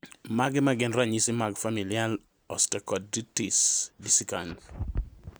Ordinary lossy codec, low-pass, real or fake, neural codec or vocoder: none; none; real; none